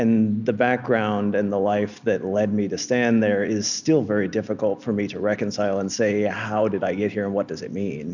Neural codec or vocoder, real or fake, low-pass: none; real; 7.2 kHz